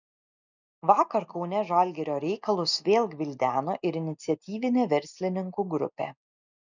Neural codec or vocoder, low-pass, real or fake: none; 7.2 kHz; real